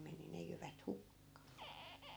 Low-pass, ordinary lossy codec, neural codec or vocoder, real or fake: none; none; none; real